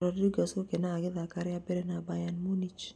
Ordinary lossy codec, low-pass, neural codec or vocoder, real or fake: none; none; none; real